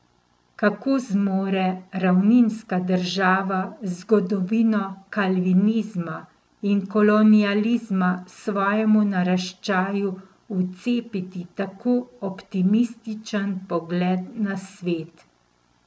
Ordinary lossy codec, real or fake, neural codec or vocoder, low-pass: none; real; none; none